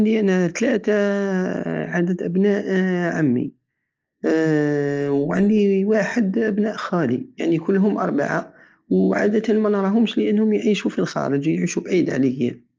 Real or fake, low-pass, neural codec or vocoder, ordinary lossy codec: real; 7.2 kHz; none; Opus, 24 kbps